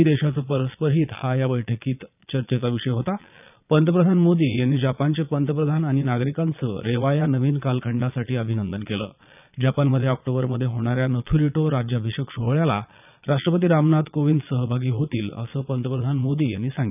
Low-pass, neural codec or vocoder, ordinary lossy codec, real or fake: 3.6 kHz; vocoder, 22.05 kHz, 80 mel bands, Vocos; none; fake